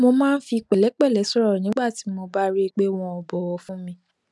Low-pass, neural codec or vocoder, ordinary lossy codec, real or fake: none; none; none; real